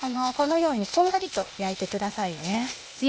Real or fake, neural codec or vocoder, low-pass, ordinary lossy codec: fake; codec, 16 kHz, 0.8 kbps, ZipCodec; none; none